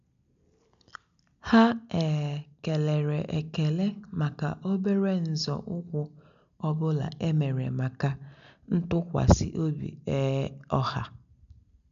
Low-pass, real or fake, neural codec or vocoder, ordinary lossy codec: 7.2 kHz; real; none; none